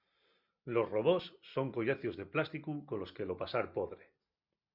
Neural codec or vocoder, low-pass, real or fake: none; 5.4 kHz; real